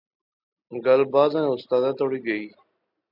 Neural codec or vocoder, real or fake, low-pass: none; real; 5.4 kHz